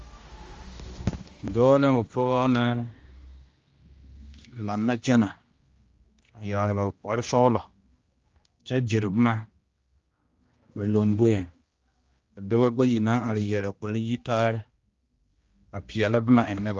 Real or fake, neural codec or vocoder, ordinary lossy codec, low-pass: fake; codec, 16 kHz, 1 kbps, X-Codec, HuBERT features, trained on general audio; Opus, 32 kbps; 7.2 kHz